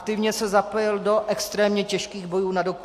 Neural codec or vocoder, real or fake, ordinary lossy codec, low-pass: none; real; AAC, 64 kbps; 14.4 kHz